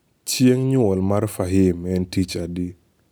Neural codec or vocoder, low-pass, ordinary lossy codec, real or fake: none; none; none; real